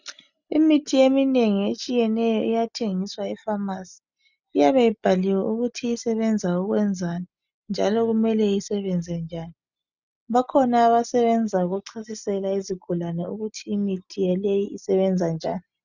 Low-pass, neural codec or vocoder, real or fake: 7.2 kHz; none; real